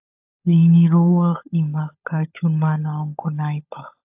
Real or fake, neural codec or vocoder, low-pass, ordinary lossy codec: fake; codec, 16 kHz, 16 kbps, FunCodec, trained on LibriTTS, 50 frames a second; 3.6 kHz; AAC, 32 kbps